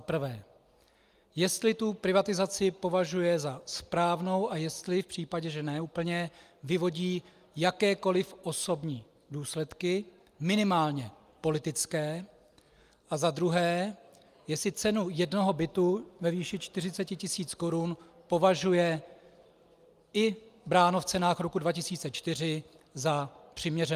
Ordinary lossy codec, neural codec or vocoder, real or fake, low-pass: Opus, 32 kbps; none; real; 14.4 kHz